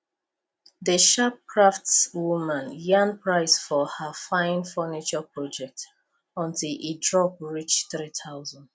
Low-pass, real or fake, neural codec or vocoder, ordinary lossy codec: none; real; none; none